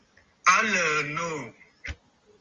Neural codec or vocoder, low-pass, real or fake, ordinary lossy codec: none; 7.2 kHz; real; Opus, 32 kbps